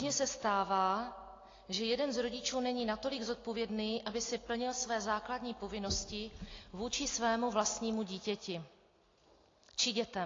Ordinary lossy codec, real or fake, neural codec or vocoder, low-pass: AAC, 32 kbps; real; none; 7.2 kHz